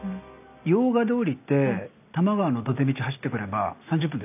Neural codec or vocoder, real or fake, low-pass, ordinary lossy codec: none; real; 3.6 kHz; none